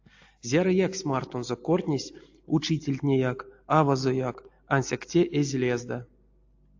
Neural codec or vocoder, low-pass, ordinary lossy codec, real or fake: none; 7.2 kHz; AAC, 48 kbps; real